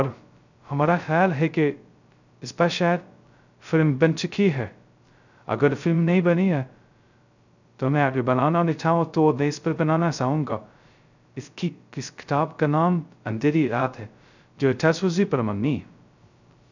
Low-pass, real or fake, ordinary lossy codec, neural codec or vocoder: 7.2 kHz; fake; none; codec, 16 kHz, 0.2 kbps, FocalCodec